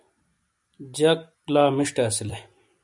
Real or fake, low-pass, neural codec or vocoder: real; 10.8 kHz; none